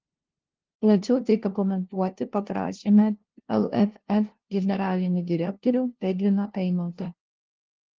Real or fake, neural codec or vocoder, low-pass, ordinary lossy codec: fake; codec, 16 kHz, 0.5 kbps, FunCodec, trained on LibriTTS, 25 frames a second; 7.2 kHz; Opus, 16 kbps